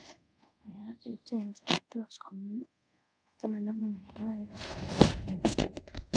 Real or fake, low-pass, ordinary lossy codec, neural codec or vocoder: fake; 9.9 kHz; none; codec, 24 kHz, 0.5 kbps, DualCodec